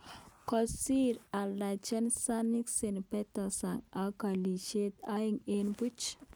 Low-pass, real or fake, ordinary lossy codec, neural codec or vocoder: none; real; none; none